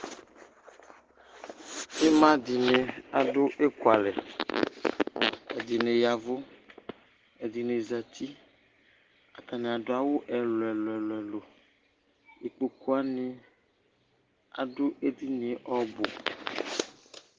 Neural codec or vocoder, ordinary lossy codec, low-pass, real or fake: none; Opus, 16 kbps; 7.2 kHz; real